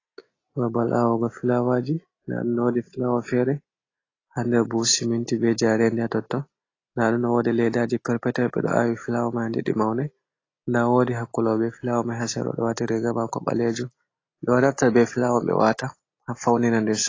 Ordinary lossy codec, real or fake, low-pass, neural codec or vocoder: AAC, 32 kbps; real; 7.2 kHz; none